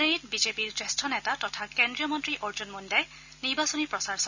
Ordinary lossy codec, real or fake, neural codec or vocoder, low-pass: none; real; none; 7.2 kHz